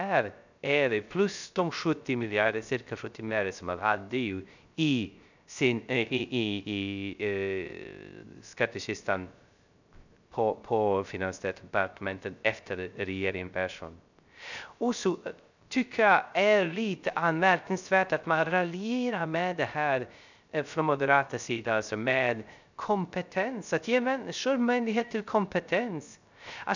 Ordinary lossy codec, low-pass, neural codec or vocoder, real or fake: none; 7.2 kHz; codec, 16 kHz, 0.3 kbps, FocalCodec; fake